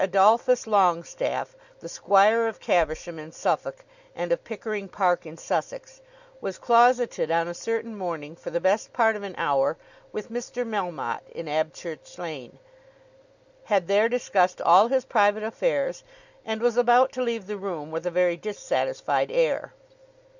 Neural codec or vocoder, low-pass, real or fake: none; 7.2 kHz; real